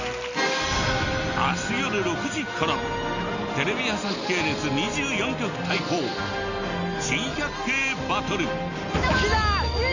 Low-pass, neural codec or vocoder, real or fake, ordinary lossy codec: 7.2 kHz; none; real; none